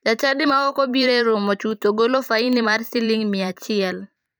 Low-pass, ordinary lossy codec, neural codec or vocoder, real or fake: none; none; vocoder, 44.1 kHz, 128 mel bands every 512 samples, BigVGAN v2; fake